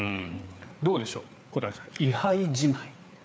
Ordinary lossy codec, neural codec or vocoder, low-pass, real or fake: none; codec, 16 kHz, 4 kbps, FreqCodec, larger model; none; fake